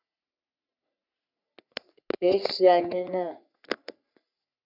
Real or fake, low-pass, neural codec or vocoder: fake; 5.4 kHz; codec, 44.1 kHz, 3.4 kbps, Pupu-Codec